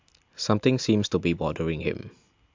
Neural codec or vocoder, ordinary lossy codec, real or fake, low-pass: none; MP3, 64 kbps; real; 7.2 kHz